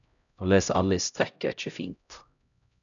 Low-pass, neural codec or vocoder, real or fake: 7.2 kHz; codec, 16 kHz, 0.5 kbps, X-Codec, HuBERT features, trained on LibriSpeech; fake